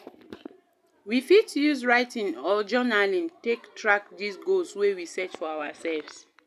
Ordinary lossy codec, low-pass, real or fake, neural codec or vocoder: none; 14.4 kHz; real; none